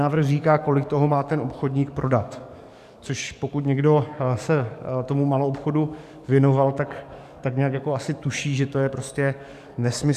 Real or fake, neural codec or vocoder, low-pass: fake; codec, 44.1 kHz, 7.8 kbps, DAC; 14.4 kHz